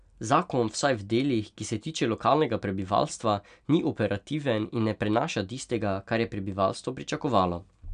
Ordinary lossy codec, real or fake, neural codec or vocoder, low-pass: none; real; none; 9.9 kHz